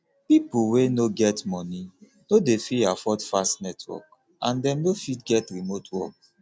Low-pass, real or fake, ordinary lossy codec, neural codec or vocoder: none; real; none; none